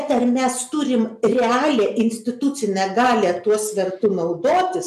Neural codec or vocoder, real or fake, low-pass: none; real; 14.4 kHz